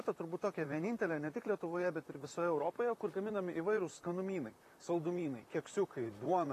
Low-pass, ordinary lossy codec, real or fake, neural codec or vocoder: 14.4 kHz; AAC, 48 kbps; fake; vocoder, 44.1 kHz, 128 mel bands, Pupu-Vocoder